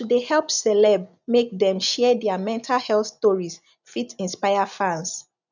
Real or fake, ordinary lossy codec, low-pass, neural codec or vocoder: real; none; 7.2 kHz; none